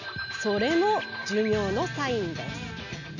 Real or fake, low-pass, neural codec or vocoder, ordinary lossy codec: real; 7.2 kHz; none; none